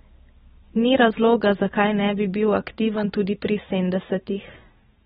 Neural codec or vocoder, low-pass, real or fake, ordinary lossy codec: none; 10.8 kHz; real; AAC, 16 kbps